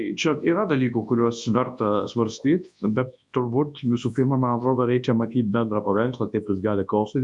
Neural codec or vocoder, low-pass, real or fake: codec, 24 kHz, 0.9 kbps, WavTokenizer, large speech release; 10.8 kHz; fake